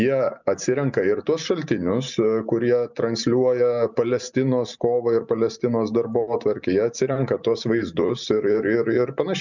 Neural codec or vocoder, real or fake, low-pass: none; real; 7.2 kHz